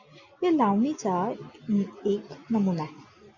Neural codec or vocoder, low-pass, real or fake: none; 7.2 kHz; real